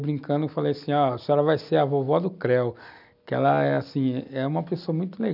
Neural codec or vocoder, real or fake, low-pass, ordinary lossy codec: none; real; 5.4 kHz; none